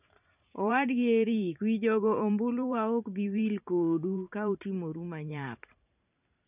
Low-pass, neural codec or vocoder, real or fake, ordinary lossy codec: 3.6 kHz; vocoder, 44.1 kHz, 128 mel bands every 512 samples, BigVGAN v2; fake; none